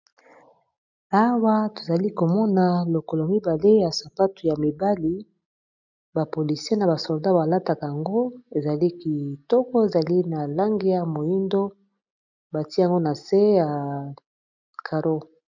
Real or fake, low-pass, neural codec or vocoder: real; 7.2 kHz; none